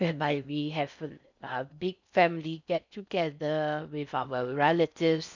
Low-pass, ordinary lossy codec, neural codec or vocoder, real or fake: 7.2 kHz; none; codec, 16 kHz in and 24 kHz out, 0.6 kbps, FocalCodec, streaming, 4096 codes; fake